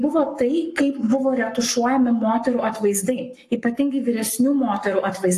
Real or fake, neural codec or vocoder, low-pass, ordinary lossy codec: fake; codec, 44.1 kHz, 7.8 kbps, Pupu-Codec; 14.4 kHz; AAC, 64 kbps